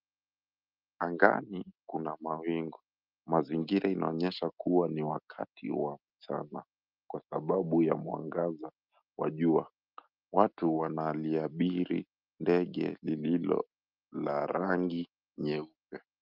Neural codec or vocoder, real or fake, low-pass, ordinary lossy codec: none; real; 5.4 kHz; Opus, 32 kbps